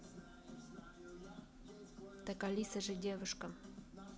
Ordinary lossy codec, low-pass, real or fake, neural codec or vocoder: none; none; real; none